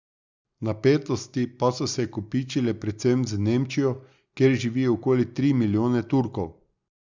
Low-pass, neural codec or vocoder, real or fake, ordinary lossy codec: 7.2 kHz; none; real; Opus, 64 kbps